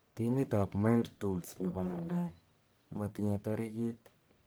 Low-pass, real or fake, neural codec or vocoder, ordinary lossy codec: none; fake; codec, 44.1 kHz, 1.7 kbps, Pupu-Codec; none